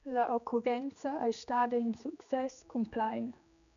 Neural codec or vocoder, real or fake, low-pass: codec, 16 kHz, 2 kbps, X-Codec, HuBERT features, trained on general audio; fake; 7.2 kHz